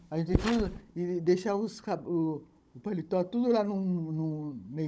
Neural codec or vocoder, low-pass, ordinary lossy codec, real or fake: codec, 16 kHz, 16 kbps, FunCodec, trained on Chinese and English, 50 frames a second; none; none; fake